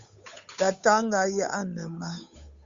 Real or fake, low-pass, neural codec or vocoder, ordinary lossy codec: fake; 7.2 kHz; codec, 16 kHz, 8 kbps, FunCodec, trained on Chinese and English, 25 frames a second; Opus, 64 kbps